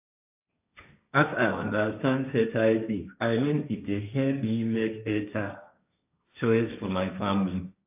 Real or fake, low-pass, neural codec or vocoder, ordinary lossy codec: fake; 3.6 kHz; codec, 16 kHz, 1.1 kbps, Voila-Tokenizer; AAC, 32 kbps